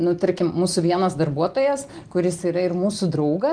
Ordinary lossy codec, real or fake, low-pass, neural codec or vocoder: Opus, 24 kbps; real; 9.9 kHz; none